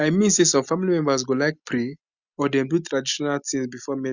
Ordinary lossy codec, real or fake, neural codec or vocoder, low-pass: Opus, 64 kbps; real; none; 7.2 kHz